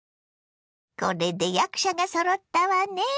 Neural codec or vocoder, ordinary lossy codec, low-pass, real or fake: none; none; none; real